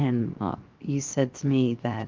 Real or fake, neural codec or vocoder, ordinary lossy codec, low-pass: fake; codec, 16 kHz, 0.7 kbps, FocalCodec; Opus, 32 kbps; 7.2 kHz